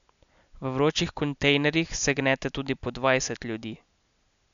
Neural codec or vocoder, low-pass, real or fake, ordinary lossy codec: none; 7.2 kHz; real; MP3, 96 kbps